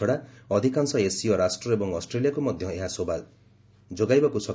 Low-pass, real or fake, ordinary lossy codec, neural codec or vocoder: none; real; none; none